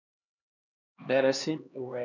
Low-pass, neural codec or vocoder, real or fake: 7.2 kHz; codec, 16 kHz, 2 kbps, X-Codec, HuBERT features, trained on LibriSpeech; fake